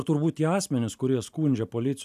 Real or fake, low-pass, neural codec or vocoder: real; 14.4 kHz; none